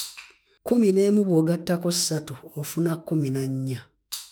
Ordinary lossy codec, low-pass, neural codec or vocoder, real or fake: none; none; autoencoder, 48 kHz, 32 numbers a frame, DAC-VAE, trained on Japanese speech; fake